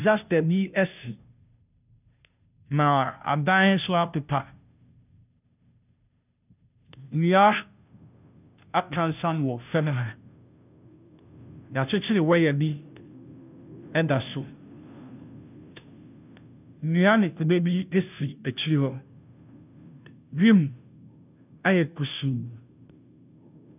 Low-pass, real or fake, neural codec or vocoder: 3.6 kHz; fake; codec, 16 kHz, 0.5 kbps, FunCodec, trained on Chinese and English, 25 frames a second